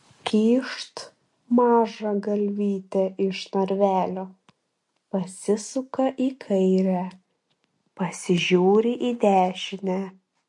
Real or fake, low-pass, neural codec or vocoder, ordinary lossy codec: real; 10.8 kHz; none; MP3, 48 kbps